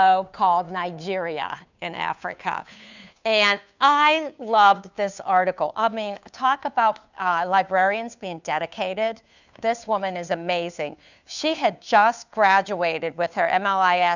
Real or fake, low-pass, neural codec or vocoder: fake; 7.2 kHz; codec, 16 kHz, 2 kbps, FunCodec, trained on Chinese and English, 25 frames a second